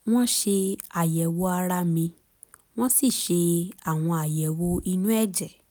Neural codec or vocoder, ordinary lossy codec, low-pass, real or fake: none; none; none; real